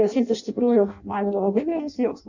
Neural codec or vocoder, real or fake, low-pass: codec, 16 kHz in and 24 kHz out, 0.6 kbps, FireRedTTS-2 codec; fake; 7.2 kHz